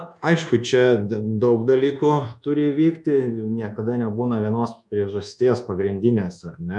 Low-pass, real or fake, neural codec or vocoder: 10.8 kHz; fake; codec, 24 kHz, 1.2 kbps, DualCodec